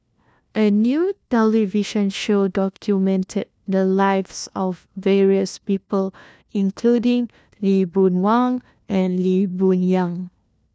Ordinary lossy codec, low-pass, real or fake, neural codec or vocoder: none; none; fake; codec, 16 kHz, 1 kbps, FunCodec, trained on LibriTTS, 50 frames a second